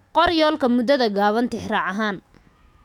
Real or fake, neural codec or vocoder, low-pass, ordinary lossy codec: fake; autoencoder, 48 kHz, 128 numbers a frame, DAC-VAE, trained on Japanese speech; 19.8 kHz; none